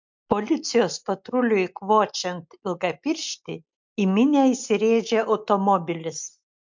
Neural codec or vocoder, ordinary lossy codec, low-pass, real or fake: none; MP3, 64 kbps; 7.2 kHz; real